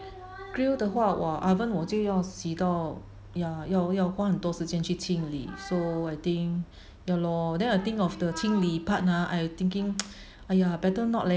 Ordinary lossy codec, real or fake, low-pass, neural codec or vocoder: none; real; none; none